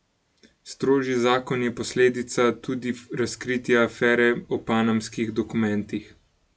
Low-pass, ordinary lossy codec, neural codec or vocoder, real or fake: none; none; none; real